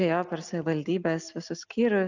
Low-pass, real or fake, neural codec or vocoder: 7.2 kHz; real; none